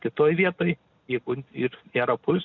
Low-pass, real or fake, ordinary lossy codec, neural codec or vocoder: 7.2 kHz; real; MP3, 64 kbps; none